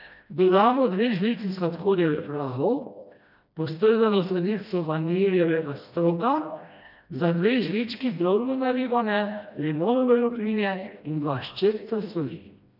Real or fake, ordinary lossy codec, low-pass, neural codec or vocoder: fake; none; 5.4 kHz; codec, 16 kHz, 1 kbps, FreqCodec, smaller model